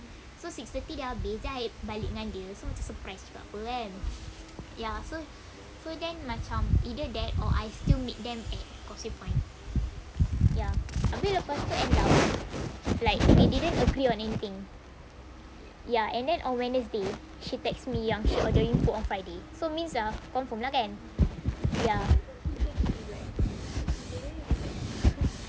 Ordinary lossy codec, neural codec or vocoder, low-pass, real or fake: none; none; none; real